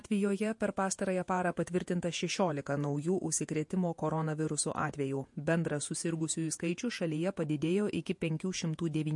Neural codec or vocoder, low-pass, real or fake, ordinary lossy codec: vocoder, 48 kHz, 128 mel bands, Vocos; 10.8 kHz; fake; MP3, 48 kbps